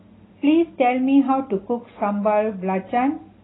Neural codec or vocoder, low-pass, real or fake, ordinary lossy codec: none; 7.2 kHz; real; AAC, 16 kbps